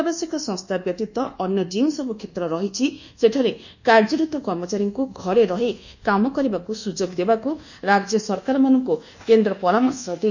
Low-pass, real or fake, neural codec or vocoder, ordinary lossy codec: 7.2 kHz; fake; codec, 24 kHz, 1.2 kbps, DualCodec; none